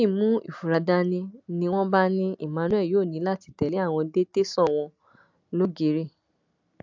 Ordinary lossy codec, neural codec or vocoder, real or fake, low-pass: MP3, 64 kbps; none; real; 7.2 kHz